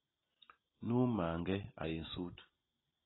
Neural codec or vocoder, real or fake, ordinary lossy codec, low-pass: none; real; AAC, 16 kbps; 7.2 kHz